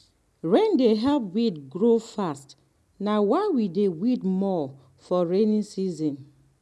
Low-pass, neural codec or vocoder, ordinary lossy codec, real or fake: none; none; none; real